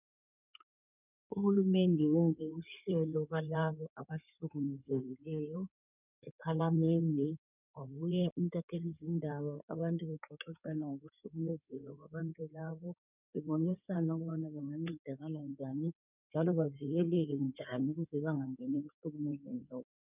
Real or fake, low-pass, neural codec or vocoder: fake; 3.6 kHz; codec, 16 kHz in and 24 kHz out, 2.2 kbps, FireRedTTS-2 codec